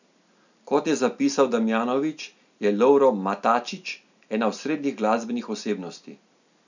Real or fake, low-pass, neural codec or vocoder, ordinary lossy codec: real; 7.2 kHz; none; none